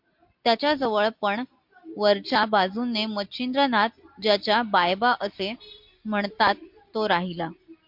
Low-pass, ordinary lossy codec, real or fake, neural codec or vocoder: 5.4 kHz; MP3, 48 kbps; real; none